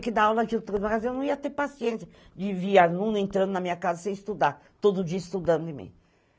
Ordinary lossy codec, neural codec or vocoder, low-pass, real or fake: none; none; none; real